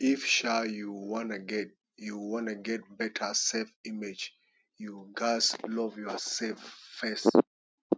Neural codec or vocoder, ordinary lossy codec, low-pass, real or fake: none; none; none; real